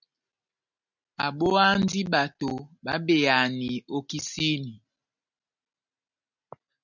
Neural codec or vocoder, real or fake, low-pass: none; real; 7.2 kHz